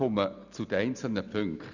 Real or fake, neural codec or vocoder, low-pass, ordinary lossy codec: real; none; 7.2 kHz; none